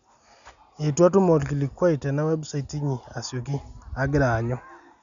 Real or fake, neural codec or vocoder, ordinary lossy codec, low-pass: real; none; none; 7.2 kHz